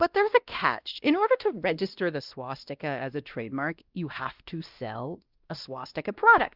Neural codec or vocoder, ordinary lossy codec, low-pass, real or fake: codec, 16 kHz, 1 kbps, X-Codec, WavLM features, trained on Multilingual LibriSpeech; Opus, 24 kbps; 5.4 kHz; fake